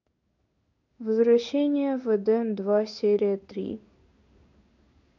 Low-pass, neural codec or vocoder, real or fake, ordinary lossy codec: 7.2 kHz; codec, 16 kHz in and 24 kHz out, 1 kbps, XY-Tokenizer; fake; none